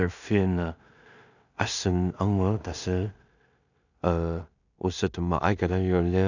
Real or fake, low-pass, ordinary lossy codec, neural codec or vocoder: fake; 7.2 kHz; none; codec, 16 kHz in and 24 kHz out, 0.4 kbps, LongCat-Audio-Codec, two codebook decoder